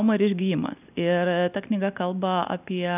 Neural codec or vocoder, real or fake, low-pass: none; real; 3.6 kHz